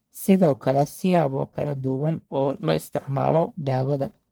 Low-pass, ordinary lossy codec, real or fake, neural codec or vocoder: none; none; fake; codec, 44.1 kHz, 1.7 kbps, Pupu-Codec